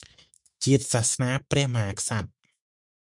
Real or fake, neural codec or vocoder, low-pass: fake; autoencoder, 48 kHz, 128 numbers a frame, DAC-VAE, trained on Japanese speech; 10.8 kHz